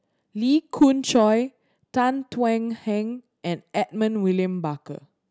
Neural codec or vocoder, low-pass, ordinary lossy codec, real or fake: none; none; none; real